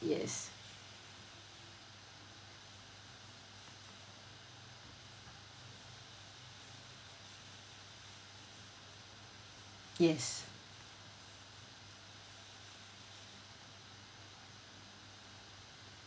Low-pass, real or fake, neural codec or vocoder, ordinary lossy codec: none; real; none; none